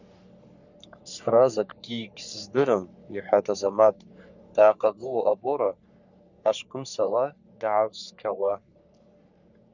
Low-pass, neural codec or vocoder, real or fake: 7.2 kHz; codec, 44.1 kHz, 3.4 kbps, Pupu-Codec; fake